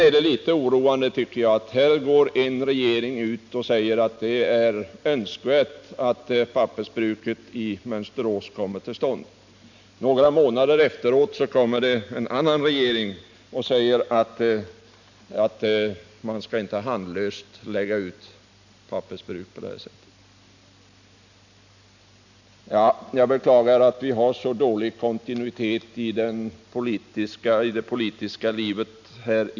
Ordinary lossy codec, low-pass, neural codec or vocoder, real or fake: none; 7.2 kHz; none; real